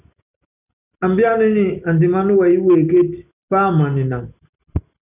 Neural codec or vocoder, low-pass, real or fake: none; 3.6 kHz; real